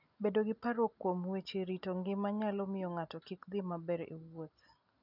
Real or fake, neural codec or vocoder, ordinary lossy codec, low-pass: real; none; none; 5.4 kHz